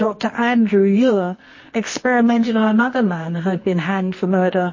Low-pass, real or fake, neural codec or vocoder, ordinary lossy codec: 7.2 kHz; fake; codec, 24 kHz, 0.9 kbps, WavTokenizer, medium music audio release; MP3, 32 kbps